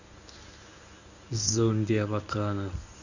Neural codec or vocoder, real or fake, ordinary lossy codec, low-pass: codec, 24 kHz, 0.9 kbps, WavTokenizer, medium speech release version 1; fake; none; 7.2 kHz